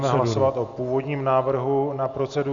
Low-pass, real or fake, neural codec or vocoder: 7.2 kHz; real; none